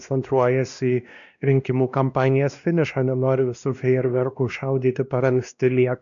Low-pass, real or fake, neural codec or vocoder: 7.2 kHz; fake; codec, 16 kHz, 1 kbps, X-Codec, WavLM features, trained on Multilingual LibriSpeech